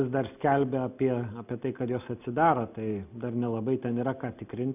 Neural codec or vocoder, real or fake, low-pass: none; real; 3.6 kHz